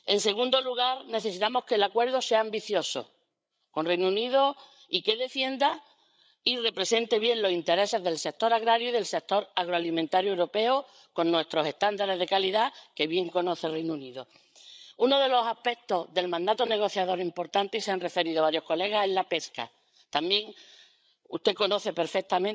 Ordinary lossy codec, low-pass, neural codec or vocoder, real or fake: none; none; codec, 16 kHz, 8 kbps, FreqCodec, larger model; fake